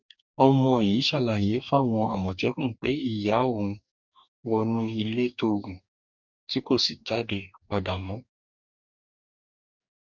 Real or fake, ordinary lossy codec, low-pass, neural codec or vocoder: fake; none; 7.2 kHz; codec, 44.1 kHz, 2.6 kbps, DAC